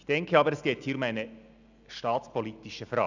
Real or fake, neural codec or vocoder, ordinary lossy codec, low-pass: real; none; none; 7.2 kHz